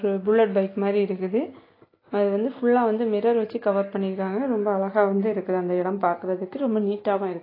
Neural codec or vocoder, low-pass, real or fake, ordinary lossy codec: none; 5.4 kHz; real; AAC, 24 kbps